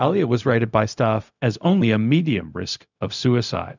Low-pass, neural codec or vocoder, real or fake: 7.2 kHz; codec, 16 kHz, 0.4 kbps, LongCat-Audio-Codec; fake